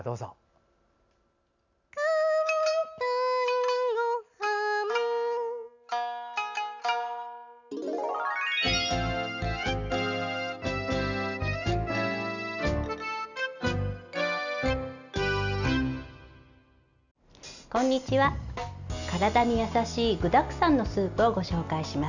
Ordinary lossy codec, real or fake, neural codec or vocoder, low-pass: none; real; none; 7.2 kHz